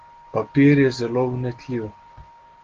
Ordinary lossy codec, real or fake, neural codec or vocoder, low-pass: Opus, 16 kbps; real; none; 7.2 kHz